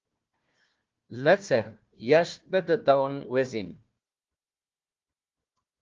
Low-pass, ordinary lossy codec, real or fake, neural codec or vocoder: 7.2 kHz; Opus, 32 kbps; fake; codec, 16 kHz, 1 kbps, FunCodec, trained on Chinese and English, 50 frames a second